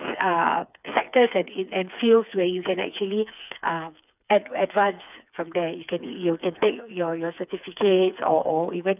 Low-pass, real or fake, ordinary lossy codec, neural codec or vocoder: 3.6 kHz; fake; none; codec, 16 kHz, 4 kbps, FreqCodec, smaller model